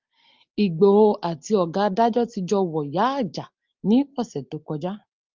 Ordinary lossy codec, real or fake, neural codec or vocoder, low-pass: Opus, 24 kbps; real; none; 7.2 kHz